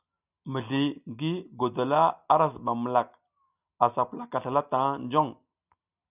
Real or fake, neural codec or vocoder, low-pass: real; none; 3.6 kHz